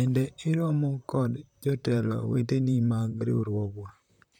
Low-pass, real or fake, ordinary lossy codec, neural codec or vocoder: 19.8 kHz; fake; none; vocoder, 44.1 kHz, 128 mel bands, Pupu-Vocoder